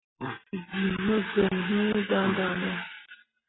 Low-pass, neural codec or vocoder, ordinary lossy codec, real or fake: 7.2 kHz; none; AAC, 16 kbps; real